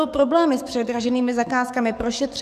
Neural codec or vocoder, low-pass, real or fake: codec, 44.1 kHz, 7.8 kbps, DAC; 14.4 kHz; fake